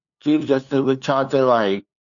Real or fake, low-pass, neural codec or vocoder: fake; 7.2 kHz; codec, 16 kHz, 2 kbps, FunCodec, trained on LibriTTS, 25 frames a second